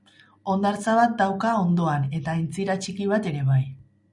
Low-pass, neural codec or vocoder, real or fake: 10.8 kHz; none; real